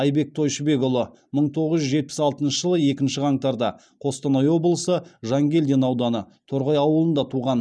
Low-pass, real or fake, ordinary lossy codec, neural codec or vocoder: none; real; none; none